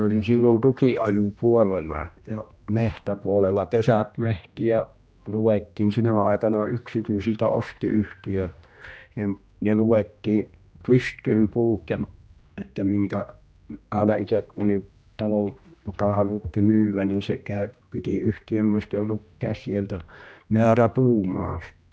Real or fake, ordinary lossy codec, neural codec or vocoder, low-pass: fake; none; codec, 16 kHz, 1 kbps, X-Codec, HuBERT features, trained on general audio; none